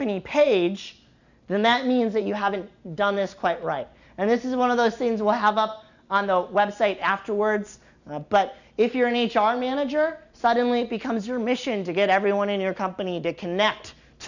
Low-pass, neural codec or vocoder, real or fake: 7.2 kHz; none; real